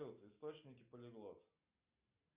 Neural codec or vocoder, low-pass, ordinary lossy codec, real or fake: none; 3.6 kHz; Opus, 64 kbps; real